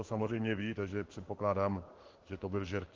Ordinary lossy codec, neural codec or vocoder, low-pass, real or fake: Opus, 16 kbps; codec, 16 kHz in and 24 kHz out, 1 kbps, XY-Tokenizer; 7.2 kHz; fake